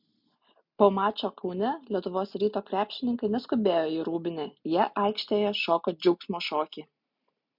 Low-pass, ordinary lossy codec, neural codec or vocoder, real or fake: 5.4 kHz; MP3, 48 kbps; none; real